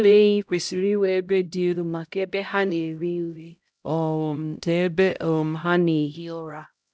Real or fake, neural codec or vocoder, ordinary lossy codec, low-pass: fake; codec, 16 kHz, 0.5 kbps, X-Codec, HuBERT features, trained on LibriSpeech; none; none